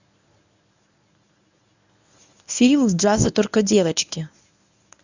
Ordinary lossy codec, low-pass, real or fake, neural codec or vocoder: none; 7.2 kHz; fake; codec, 24 kHz, 0.9 kbps, WavTokenizer, medium speech release version 1